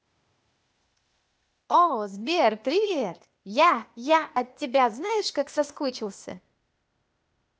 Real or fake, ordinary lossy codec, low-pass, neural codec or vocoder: fake; none; none; codec, 16 kHz, 0.8 kbps, ZipCodec